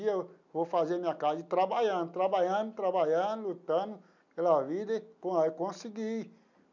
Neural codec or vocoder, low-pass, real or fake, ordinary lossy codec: none; 7.2 kHz; real; none